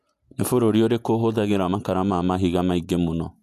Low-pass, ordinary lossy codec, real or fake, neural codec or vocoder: 14.4 kHz; none; fake; vocoder, 48 kHz, 128 mel bands, Vocos